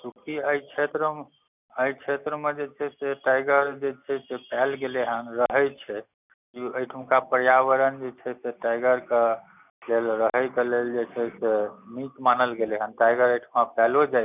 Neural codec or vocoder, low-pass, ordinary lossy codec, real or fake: none; 3.6 kHz; none; real